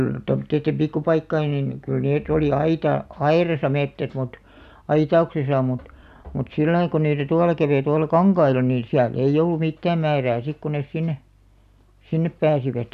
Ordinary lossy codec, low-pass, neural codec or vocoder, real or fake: none; 14.4 kHz; none; real